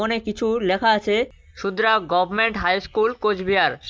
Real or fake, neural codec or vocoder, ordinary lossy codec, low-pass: real; none; none; none